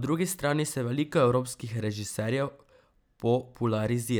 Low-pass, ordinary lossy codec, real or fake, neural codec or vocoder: none; none; real; none